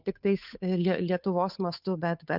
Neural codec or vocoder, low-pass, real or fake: codec, 16 kHz, 8 kbps, FunCodec, trained on Chinese and English, 25 frames a second; 5.4 kHz; fake